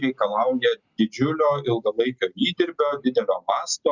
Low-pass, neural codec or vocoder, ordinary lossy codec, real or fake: 7.2 kHz; none; Opus, 64 kbps; real